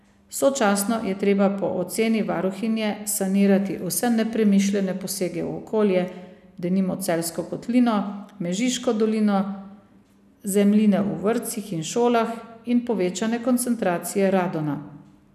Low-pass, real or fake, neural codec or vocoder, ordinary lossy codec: 14.4 kHz; real; none; none